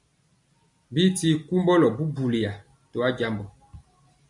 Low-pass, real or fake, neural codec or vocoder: 10.8 kHz; real; none